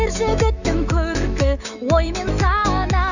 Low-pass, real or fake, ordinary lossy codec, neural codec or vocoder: 7.2 kHz; fake; none; autoencoder, 48 kHz, 128 numbers a frame, DAC-VAE, trained on Japanese speech